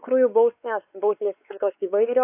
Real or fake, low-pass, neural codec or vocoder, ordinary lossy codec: fake; 3.6 kHz; codec, 16 kHz, 4 kbps, X-Codec, WavLM features, trained on Multilingual LibriSpeech; AAC, 32 kbps